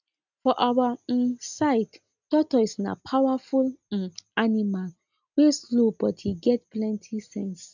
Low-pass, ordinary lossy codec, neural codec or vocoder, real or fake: 7.2 kHz; none; none; real